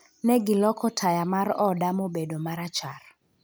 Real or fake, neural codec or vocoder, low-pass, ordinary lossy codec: real; none; none; none